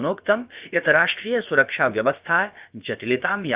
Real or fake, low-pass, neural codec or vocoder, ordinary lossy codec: fake; 3.6 kHz; codec, 16 kHz, about 1 kbps, DyCAST, with the encoder's durations; Opus, 24 kbps